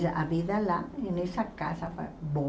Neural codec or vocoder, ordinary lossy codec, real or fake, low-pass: none; none; real; none